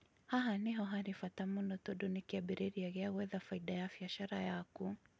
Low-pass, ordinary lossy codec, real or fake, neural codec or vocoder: none; none; real; none